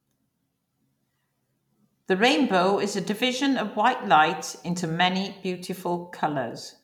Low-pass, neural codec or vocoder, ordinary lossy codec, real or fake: 19.8 kHz; vocoder, 44.1 kHz, 128 mel bands every 256 samples, BigVGAN v2; none; fake